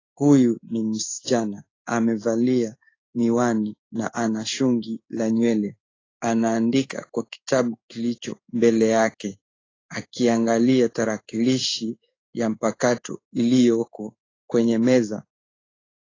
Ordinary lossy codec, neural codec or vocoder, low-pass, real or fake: AAC, 32 kbps; codec, 16 kHz in and 24 kHz out, 1 kbps, XY-Tokenizer; 7.2 kHz; fake